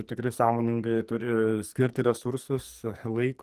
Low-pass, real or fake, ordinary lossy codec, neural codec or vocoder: 14.4 kHz; fake; Opus, 24 kbps; codec, 44.1 kHz, 2.6 kbps, SNAC